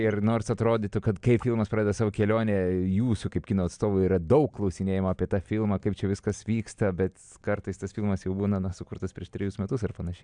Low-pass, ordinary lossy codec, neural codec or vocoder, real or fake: 9.9 kHz; MP3, 96 kbps; none; real